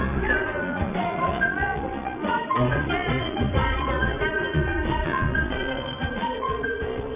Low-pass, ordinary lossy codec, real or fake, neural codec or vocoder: 3.6 kHz; none; fake; vocoder, 22.05 kHz, 80 mel bands, Vocos